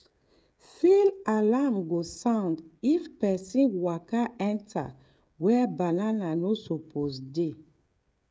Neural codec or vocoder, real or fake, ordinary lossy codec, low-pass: codec, 16 kHz, 16 kbps, FreqCodec, smaller model; fake; none; none